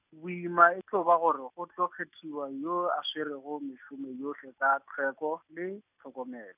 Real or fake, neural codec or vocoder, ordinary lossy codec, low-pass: real; none; none; 3.6 kHz